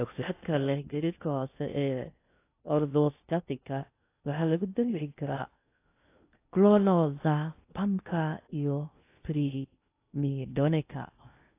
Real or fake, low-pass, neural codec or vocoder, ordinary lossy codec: fake; 3.6 kHz; codec, 16 kHz in and 24 kHz out, 0.6 kbps, FocalCodec, streaming, 2048 codes; AAC, 24 kbps